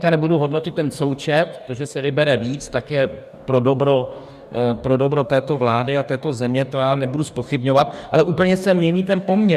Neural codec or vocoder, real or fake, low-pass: codec, 44.1 kHz, 2.6 kbps, DAC; fake; 14.4 kHz